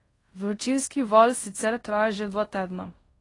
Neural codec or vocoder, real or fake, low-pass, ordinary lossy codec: codec, 24 kHz, 0.5 kbps, DualCodec; fake; 10.8 kHz; AAC, 32 kbps